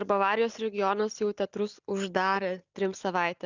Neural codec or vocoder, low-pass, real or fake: none; 7.2 kHz; real